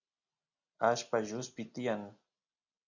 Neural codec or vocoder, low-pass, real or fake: none; 7.2 kHz; real